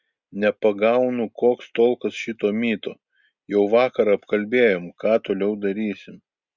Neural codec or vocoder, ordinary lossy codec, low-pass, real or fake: none; Opus, 64 kbps; 7.2 kHz; real